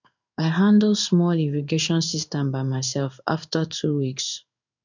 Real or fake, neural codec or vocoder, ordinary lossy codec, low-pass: fake; codec, 16 kHz in and 24 kHz out, 1 kbps, XY-Tokenizer; none; 7.2 kHz